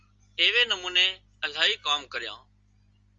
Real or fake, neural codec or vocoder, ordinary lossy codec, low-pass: real; none; Opus, 32 kbps; 7.2 kHz